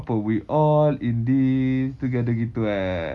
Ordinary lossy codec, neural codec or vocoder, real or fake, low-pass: none; none; real; none